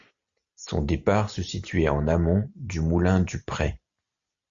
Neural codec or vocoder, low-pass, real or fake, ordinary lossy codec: none; 7.2 kHz; real; AAC, 64 kbps